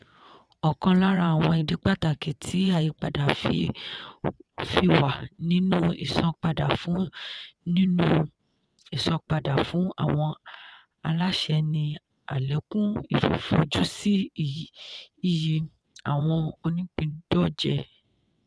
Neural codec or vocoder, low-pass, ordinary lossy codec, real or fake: vocoder, 22.05 kHz, 80 mel bands, WaveNeXt; none; none; fake